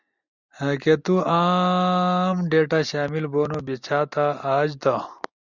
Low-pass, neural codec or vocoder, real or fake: 7.2 kHz; none; real